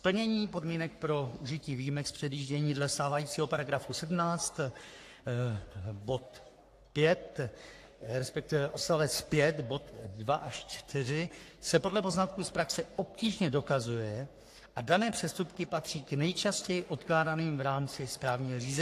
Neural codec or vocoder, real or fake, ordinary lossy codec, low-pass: codec, 44.1 kHz, 3.4 kbps, Pupu-Codec; fake; AAC, 64 kbps; 14.4 kHz